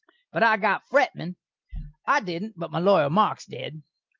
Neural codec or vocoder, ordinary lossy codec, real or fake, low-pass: none; Opus, 32 kbps; real; 7.2 kHz